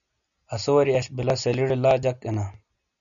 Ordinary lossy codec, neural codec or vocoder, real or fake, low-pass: MP3, 96 kbps; none; real; 7.2 kHz